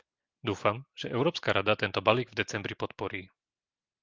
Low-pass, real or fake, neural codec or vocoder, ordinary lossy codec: 7.2 kHz; real; none; Opus, 32 kbps